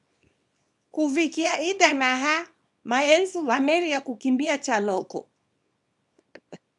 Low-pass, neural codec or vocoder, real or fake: 10.8 kHz; codec, 24 kHz, 0.9 kbps, WavTokenizer, small release; fake